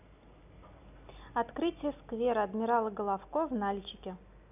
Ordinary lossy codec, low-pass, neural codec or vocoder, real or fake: none; 3.6 kHz; none; real